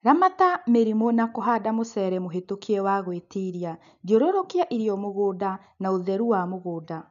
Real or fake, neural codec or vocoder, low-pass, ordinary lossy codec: real; none; 7.2 kHz; none